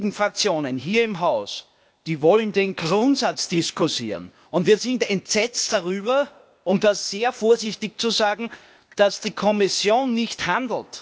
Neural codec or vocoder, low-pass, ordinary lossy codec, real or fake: codec, 16 kHz, 0.8 kbps, ZipCodec; none; none; fake